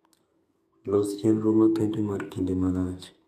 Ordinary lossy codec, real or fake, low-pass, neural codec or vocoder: none; fake; 14.4 kHz; codec, 32 kHz, 1.9 kbps, SNAC